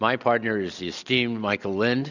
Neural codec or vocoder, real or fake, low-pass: none; real; 7.2 kHz